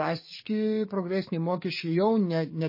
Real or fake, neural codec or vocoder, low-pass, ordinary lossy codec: fake; codec, 44.1 kHz, 7.8 kbps, DAC; 5.4 kHz; MP3, 24 kbps